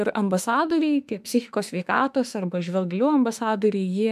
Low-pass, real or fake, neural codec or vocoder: 14.4 kHz; fake; autoencoder, 48 kHz, 32 numbers a frame, DAC-VAE, trained on Japanese speech